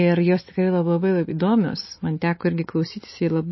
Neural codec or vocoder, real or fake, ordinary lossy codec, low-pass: none; real; MP3, 24 kbps; 7.2 kHz